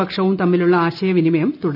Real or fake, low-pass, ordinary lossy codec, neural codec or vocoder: real; 5.4 kHz; none; none